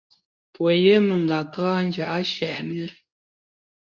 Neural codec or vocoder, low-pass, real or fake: codec, 24 kHz, 0.9 kbps, WavTokenizer, medium speech release version 2; 7.2 kHz; fake